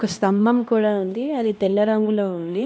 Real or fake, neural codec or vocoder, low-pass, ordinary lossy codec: fake; codec, 16 kHz, 1 kbps, X-Codec, HuBERT features, trained on LibriSpeech; none; none